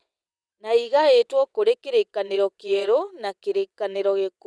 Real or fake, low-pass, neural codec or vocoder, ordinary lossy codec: fake; none; vocoder, 22.05 kHz, 80 mel bands, WaveNeXt; none